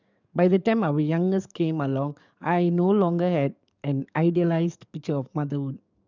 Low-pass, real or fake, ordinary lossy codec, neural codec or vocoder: 7.2 kHz; fake; none; codec, 44.1 kHz, 7.8 kbps, DAC